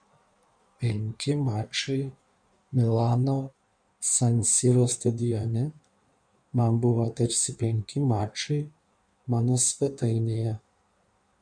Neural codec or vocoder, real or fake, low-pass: codec, 16 kHz in and 24 kHz out, 1.1 kbps, FireRedTTS-2 codec; fake; 9.9 kHz